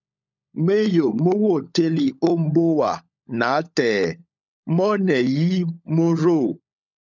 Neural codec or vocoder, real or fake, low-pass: codec, 16 kHz, 16 kbps, FunCodec, trained on LibriTTS, 50 frames a second; fake; 7.2 kHz